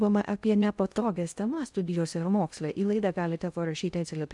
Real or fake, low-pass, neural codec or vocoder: fake; 10.8 kHz; codec, 16 kHz in and 24 kHz out, 0.6 kbps, FocalCodec, streaming, 2048 codes